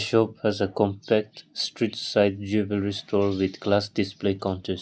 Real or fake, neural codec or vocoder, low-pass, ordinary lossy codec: real; none; none; none